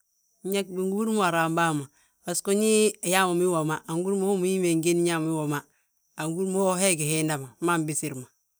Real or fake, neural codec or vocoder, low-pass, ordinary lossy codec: real; none; none; none